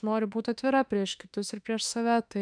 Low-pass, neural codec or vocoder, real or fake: 9.9 kHz; autoencoder, 48 kHz, 32 numbers a frame, DAC-VAE, trained on Japanese speech; fake